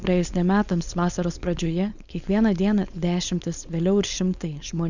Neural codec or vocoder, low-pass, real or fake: codec, 16 kHz, 4.8 kbps, FACodec; 7.2 kHz; fake